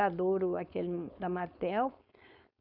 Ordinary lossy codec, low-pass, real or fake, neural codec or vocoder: none; 5.4 kHz; fake; codec, 16 kHz, 4.8 kbps, FACodec